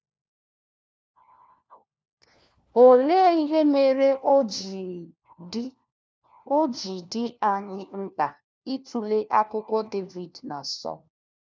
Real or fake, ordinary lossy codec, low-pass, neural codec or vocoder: fake; none; none; codec, 16 kHz, 1 kbps, FunCodec, trained on LibriTTS, 50 frames a second